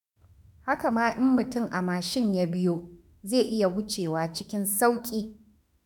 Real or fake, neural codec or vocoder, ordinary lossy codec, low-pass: fake; autoencoder, 48 kHz, 32 numbers a frame, DAC-VAE, trained on Japanese speech; none; 19.8 kHz